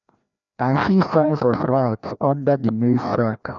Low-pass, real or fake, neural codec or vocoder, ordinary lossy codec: 7.2 kHz; fake; codec, 16 kHz, 1 kbps, FreqCodec, larger model; none